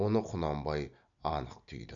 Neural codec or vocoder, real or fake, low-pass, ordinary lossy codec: none; real; 7.2 kHz; none